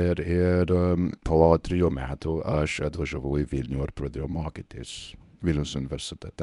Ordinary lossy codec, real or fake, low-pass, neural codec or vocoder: MP3, 96 kbps; fake; 10.8 kHz; codec, 24 kHz, 0.9 kbps, WavTokenizer, medium speech release version 1